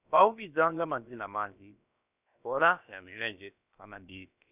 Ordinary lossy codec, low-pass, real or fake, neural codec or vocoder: none; 3.6 kHz; fake; codec, 16 kHz, about 1 kbps, DyCAST, with the encoder's durations